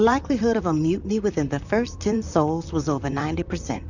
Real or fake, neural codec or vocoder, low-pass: fake; vocoder, 44.1 kHz, 128 mel bands, Pupu-Vocoder; 7.2 kHz